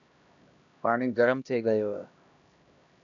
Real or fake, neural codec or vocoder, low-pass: fake; codec, 16 kHz, 1 kbps, X-Codec, HuBERT features, trained on LibriSpeech; 7.2 kHz